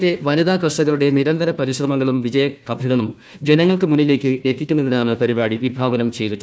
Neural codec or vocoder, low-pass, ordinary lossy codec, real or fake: codec, 16 kHz, 1 kbps, FunCodec, trained on Chinese and English, 50 frames a second; none; none; fake